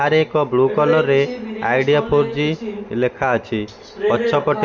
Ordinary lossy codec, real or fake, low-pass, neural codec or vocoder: none; real; 7.2 kHz; none